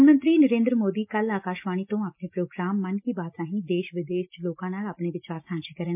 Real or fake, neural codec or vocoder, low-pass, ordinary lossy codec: real; none; 3.6 kHz; MP3, 32 kbps